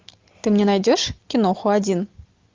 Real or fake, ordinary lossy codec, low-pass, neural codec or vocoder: real; Opus, 32 kbps; 7.2 kHz; none